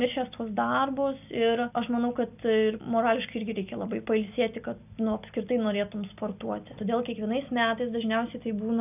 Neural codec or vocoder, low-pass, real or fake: none; 3.6 kHz; real